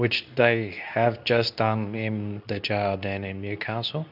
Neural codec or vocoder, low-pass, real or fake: codec, 24 kHz, 0.9 kbps, WavTokenizer, medium speech release version 2; 5.4 kHz; fake